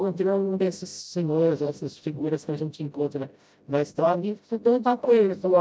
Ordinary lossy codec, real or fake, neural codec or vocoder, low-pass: none; fake; codec, 16 kHz, 0.5 kbps, FreqCodec, smaller model; none